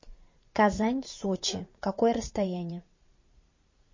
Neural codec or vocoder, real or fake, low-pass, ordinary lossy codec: vocoder, 44.1 kHz, 80 mel bands, Vocos; fake; 7.2 kHz; MP3, 32 kbps